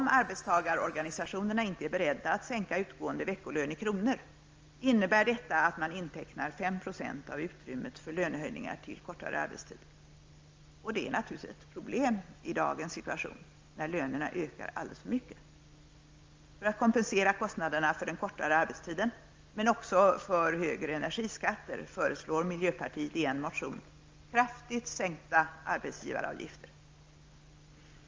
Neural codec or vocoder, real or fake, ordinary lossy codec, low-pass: none; real; Opus, 24 kbps; 7.2 kHz